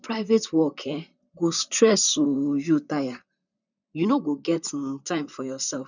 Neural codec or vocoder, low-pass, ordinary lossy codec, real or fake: vocoder, 44.1 kHz, 128 mel bands, Pupu-Vocoder; 7.2 kHz; none; fake